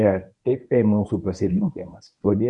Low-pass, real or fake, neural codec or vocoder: 10.8 kHz; fake; codec, 24 kHz, 0.9 kbps, WavTokenizer, medium speech release version 1